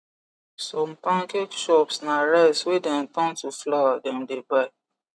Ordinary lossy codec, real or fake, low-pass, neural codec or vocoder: none; real; none; none